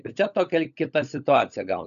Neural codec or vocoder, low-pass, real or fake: codec, 16 kHz, 16 kbps, FunCodec, trained on LibriTTS, 50 frames a second; 7.2 kHz; fake